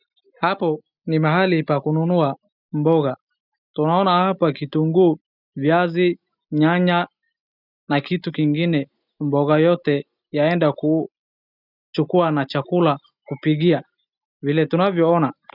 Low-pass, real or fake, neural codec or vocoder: 5.4 kHz; real; none